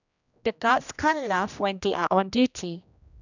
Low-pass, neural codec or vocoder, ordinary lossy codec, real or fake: 7.2 kHz; codec, 16 kHz, 1 kbps, X-Codec, HuBERT features, trained on general audio; none; fake